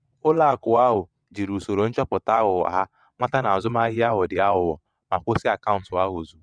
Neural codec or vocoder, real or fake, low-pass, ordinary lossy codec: vocoder, 22.05 kHz, 80 mel bands, WaveNeXt; fake; 9.9 kHz; none